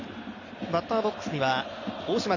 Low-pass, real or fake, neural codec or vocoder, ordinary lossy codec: 7.2 kHz; real; none; none